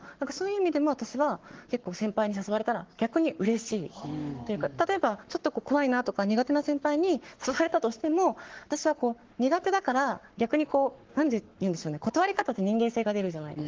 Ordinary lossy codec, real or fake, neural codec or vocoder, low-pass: Opus, 16 kbps; fake; codec, 44.1 kHz, 7.8 kbps, Pupu-Codec; 7.2 kHz